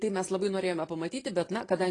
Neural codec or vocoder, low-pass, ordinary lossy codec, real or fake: vocoder, 44.1 kHz, 128 mel bands every 512 samples, BigVGAN v2; 10.8 kHz; AAC, 32 kbps; fake